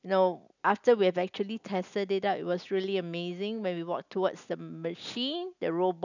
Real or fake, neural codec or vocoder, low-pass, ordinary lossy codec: real; none; 7.2 kHz; none